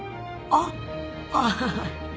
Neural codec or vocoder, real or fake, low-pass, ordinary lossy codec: none; real; none; none